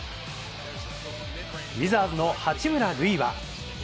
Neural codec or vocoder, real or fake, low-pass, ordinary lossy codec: none; real; none; none